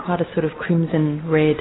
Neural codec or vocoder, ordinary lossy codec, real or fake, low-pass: none; AAC, 16 kbps; real; 7.2 kHz